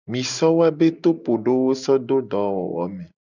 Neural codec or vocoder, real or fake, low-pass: none; real; 7.2 kHz